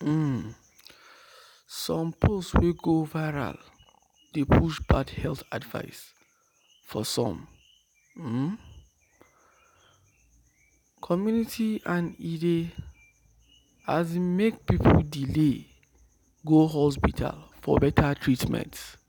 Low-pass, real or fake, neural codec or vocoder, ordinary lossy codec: none; real; none; none